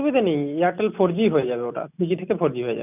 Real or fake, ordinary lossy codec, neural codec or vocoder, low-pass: real; none; none; 3.6 kHz